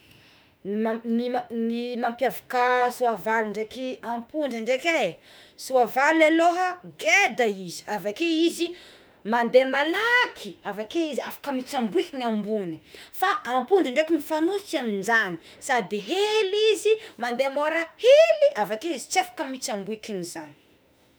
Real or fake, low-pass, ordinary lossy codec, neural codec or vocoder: fake; none; none; autoencoder, 48 kHz, 32 numbers a frame, DAC-VAE, trained on Japanese speech